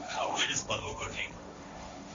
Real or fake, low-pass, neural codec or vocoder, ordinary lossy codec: fake; 7.2 kHz; codec, 16 kHz, 1.1 kbps, Voila-Tokenizer; MP3, 48 kbps